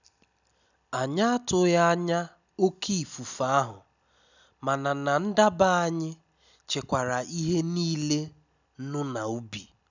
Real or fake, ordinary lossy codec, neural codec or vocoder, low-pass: real; none; none; 7.2 kHz